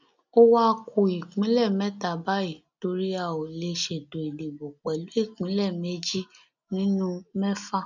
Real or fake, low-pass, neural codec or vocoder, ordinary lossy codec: real; 7.2 kHz; none; none